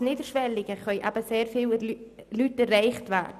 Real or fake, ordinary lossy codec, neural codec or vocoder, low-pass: real; none; none; 14.4 kHz